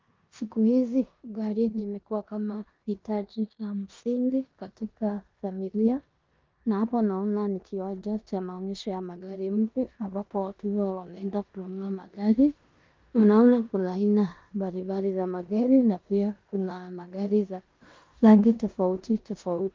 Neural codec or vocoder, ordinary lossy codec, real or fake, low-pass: codec, 16 kHz in and 24 kHz out, 0.9 kbps, LongCat-Audio-Codec, four codebook decoder; Opus, 32 kbps; fake; 7.2 kHz